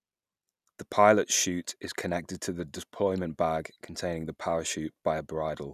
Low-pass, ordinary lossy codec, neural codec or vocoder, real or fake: 14.4 kHz; none; none; real